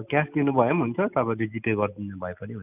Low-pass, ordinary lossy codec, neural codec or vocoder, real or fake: 3.6 kHz; none; none; real